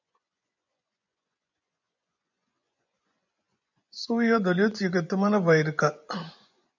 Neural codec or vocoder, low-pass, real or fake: vocoder, 24 kHz, 100 mel bands, Vocos; 7.2 kHz; fake